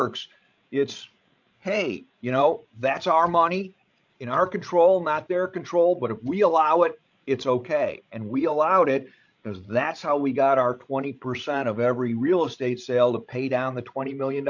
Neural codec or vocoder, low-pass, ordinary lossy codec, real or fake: codec, 16 kHz, 16 kbps, FreqCodec, larger model; 7.2 kHz; MP3, 64 kbps; fake